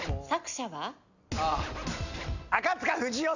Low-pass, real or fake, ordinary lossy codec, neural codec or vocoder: 7.2 kHz; real; none; none